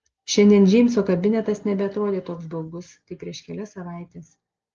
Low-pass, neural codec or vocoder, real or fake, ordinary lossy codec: 7.2 kHz; none; real; Opus, 24 kbps